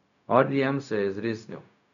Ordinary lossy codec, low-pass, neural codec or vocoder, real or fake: none; 7.2 kHz; codec, 16 kHz, 0.4 kbps, LongCat-Audio-Codec; fake